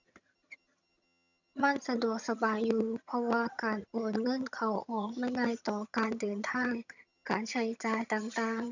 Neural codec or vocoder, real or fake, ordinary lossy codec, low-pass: vocoder, 22.05 kHz, 80 mel bands, HiFi-GAN; fake; none; 7.2 kHz